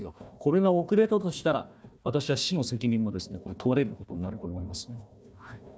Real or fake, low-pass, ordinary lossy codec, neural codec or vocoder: fake; none; none; codec, 16 kHz, 1 kbps, FunCodec, trained on Chinese and English, 50 frames a second